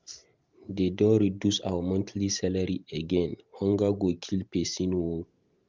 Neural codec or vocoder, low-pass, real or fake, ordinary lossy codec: vocoder, 44.1 kHz, 128 mel bands every 512 samples, BigVGAN v2; 7.2 kHz; fake; Opus, 24 kbps